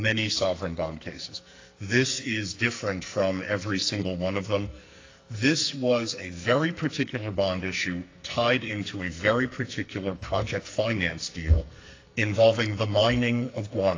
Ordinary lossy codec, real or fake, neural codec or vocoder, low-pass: AAC, 32 kbps; fake; codec, 44.1 kHz, 2.6 kbps, SNAC; 7.2 kHz